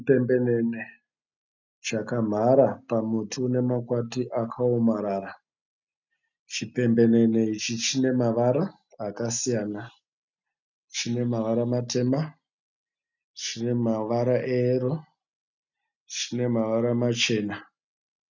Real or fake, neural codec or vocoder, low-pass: real; none; 7.2 kHz